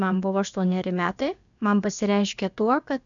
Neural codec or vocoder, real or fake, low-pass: codec, 16 kHz, about 1 kbps, DyCAST, with the encoder's durations; fake; 7.2 kHz